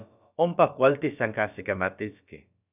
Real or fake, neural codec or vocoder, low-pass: fake; codec, 16 kHz, about 1 kbps, DyCAST, with the encoder's durations; 3.6 kHz